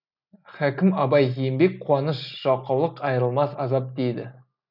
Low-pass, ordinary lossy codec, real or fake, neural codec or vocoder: 5.4 kHz; none; real; none